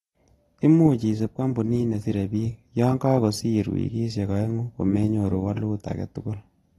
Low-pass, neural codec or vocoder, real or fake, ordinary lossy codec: 19.8 kHz; none; real; AAC, 32 kbps